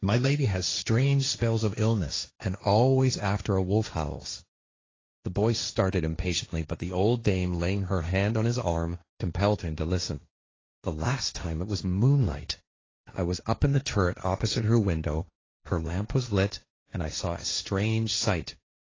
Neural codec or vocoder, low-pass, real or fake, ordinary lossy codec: codec, 16 kHz, 1.1 kbps, Voila-Tokenizer; 7.2 kHz; fake; AAC, 32 kbps